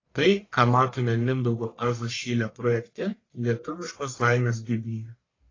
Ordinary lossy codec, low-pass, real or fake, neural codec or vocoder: AAC, 32 kbps; 7.2 kHz; fake; codec, 44.1 kHz, 1.7 kbps, Pupu-Codec